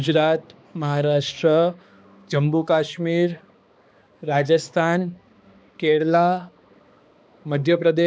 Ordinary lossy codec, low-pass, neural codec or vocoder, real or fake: none; none; codec, 16 kHz, 2 kbps, X-Codec, HuBERT features, trained on balanced general audio; fake